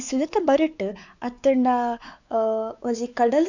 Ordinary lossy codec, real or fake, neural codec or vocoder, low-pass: none; fake; codec, 16 kHz, 4 kbps, X-Codec, WavLM features, trained on Multilingual LibriSpeech; 7.2 kHz